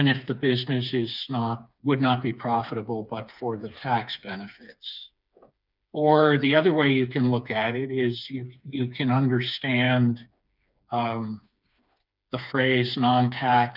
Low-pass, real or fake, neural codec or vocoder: 5.4 kHz; fake; codec, 16 kHz, 4 kbps, FreqCodec, smaller model